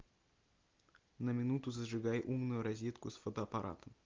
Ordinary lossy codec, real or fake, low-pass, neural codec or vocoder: Opus, 32 kbps; real; 7.2 kHz; none